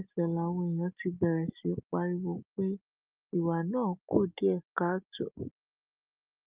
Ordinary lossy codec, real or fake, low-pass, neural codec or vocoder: Opus, 24 kbps; real; 3.6 kHz; none